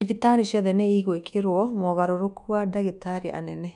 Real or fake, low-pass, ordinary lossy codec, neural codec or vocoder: fake; 10.8 kHz; none; codec, 24 kHz, 1.2 kbps, DualCodec